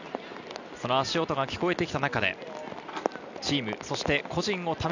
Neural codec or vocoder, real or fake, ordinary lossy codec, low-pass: none; real; none; 7.2 kHz